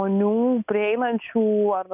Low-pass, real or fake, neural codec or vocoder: 3.6 kHz; real; none